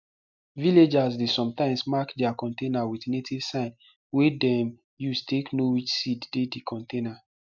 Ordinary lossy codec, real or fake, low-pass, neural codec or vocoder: MP3, 64 kbps; real; 7.2 kHz; none